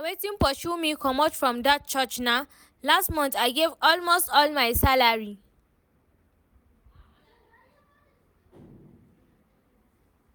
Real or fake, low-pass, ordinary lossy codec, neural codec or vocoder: real; none; none; none